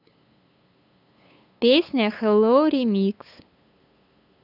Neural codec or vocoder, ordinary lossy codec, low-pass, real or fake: codec, 16 kHz, 8 kbps, FunCodec, trained on LibriTTS, 25 frames a second; none; 5.4 kHz; fake